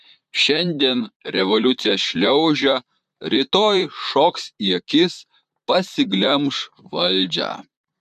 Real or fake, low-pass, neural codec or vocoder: fake; 14.4 kHz; vocoder, 44.1 kHz, 128 mel bands, Pupu-Vocoder